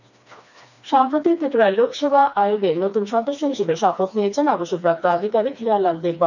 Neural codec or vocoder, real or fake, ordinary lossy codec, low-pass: codec, 16 kHz, 2 kbps, FreqCodec, smaller model; fake; none; 7.2 kHz